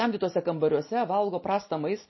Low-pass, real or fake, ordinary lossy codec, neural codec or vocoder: 7.2 kHz; real; MP3, 24 kbps; none